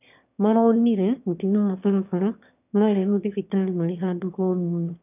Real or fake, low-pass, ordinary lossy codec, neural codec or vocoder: fake; 3.6 kHz; none; autoencoder, 22.05 kHz, a latent of 192 numbers a frame, VITS, trained on one speaker